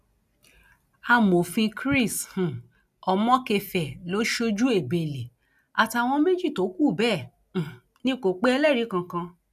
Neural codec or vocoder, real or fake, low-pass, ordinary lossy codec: none; real; 14.4 kHz; none